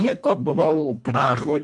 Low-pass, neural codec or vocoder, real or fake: 10.8 kHz; codec, 24 kHz, 1.5 kbps, HILCodec; fake